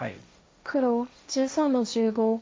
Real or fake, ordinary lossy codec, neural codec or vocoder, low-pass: fake; none; codec, 16 kHz, 1.1 kbps, Voila-Tokenizer; none